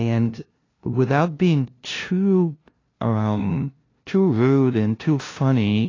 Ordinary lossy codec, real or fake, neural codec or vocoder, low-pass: AAC, 32 kbps; fake; codec, 16 kHz, 0.5 kbps, FunCodec, trained on LibriTTS, 25 frames a second; 7.2 kHz